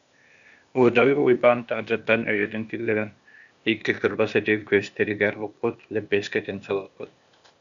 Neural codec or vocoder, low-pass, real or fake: codec, 16 kHz, 0.8 kbps, ZipCodec; 7.2 kHz; fake